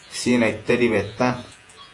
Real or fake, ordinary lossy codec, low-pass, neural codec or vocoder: fake; AAC, 48 kbps; 10.8 kHz; vocoder, 48 kHz, 128 mel bands, Vocos